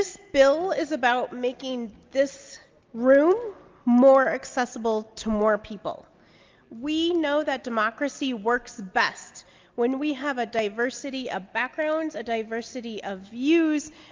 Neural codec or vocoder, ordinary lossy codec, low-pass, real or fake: none; Opus, 32 kbps; 7.2 kHz; real